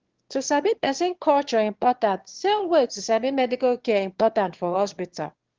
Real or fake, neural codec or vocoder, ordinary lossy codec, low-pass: fake; autoencoder, 22.05 kHz, a latent of 192 numbers a frame, VITS, trained on one speaker; Opus, 16 kbps; 7.2 kHz